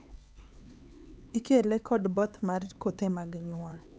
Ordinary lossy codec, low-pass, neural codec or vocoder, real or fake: none; none; codec, 16 kHz, 4 kbps, X-Codec, HuBERT features, trained on LibriSpeech; fake